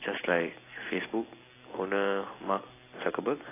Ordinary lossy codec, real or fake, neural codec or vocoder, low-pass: AAC, 16 kbps; real; none; 3.6 kHz